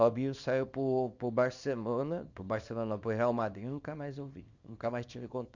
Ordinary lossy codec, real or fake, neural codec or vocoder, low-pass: Opus, 64 kbps; fake; codec, 24 kHz, 0.9 kbps, WavTokenizer, small release; 7.2 kHz